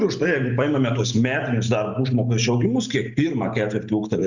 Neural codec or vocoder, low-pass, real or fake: none; 7.2 kHz; real